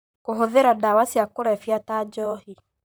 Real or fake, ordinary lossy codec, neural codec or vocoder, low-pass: fake; none; vocoder, 44.1 kHz, 128 mel bands, Pupu-Vocoder; none